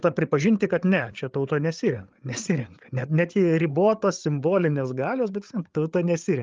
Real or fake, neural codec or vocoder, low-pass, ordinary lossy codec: fake; codec, 16 kHz, 8 kbps, FreqCodec, larger model; 7.2 kHz; Opus, 32 kbps